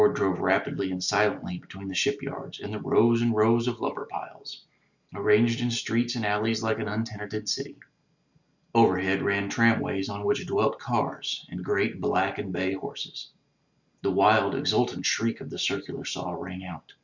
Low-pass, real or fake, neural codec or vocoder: 7.2 kHz; real; none